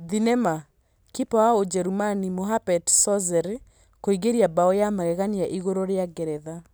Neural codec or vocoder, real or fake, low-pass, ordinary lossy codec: none; real; none; none